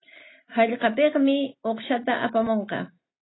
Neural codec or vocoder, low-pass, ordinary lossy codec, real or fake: none; 7.2 kHz; AAC, 16 kbps; real